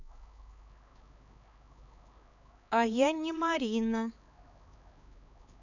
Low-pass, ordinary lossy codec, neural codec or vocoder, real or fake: 7.2 kHz; none; codec, 16 kHz, 4 kbps, X-Codec, HuBERT features, trained on LibriSpeech; fake